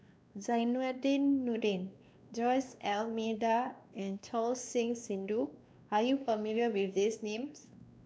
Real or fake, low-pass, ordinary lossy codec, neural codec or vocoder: fake; none; none; codec, 16 kHz, 2 kbps, X-Codec, WavLM features, trained on Multilingual LibriSpeech